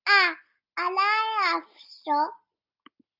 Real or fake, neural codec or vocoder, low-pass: real; none; 5.4 kHz